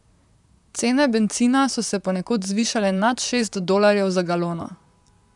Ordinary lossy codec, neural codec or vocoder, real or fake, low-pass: none; vocoder, 44.1 kHz, 128 mel bands, Pupu-Vocoder; fake; 10.8 kHz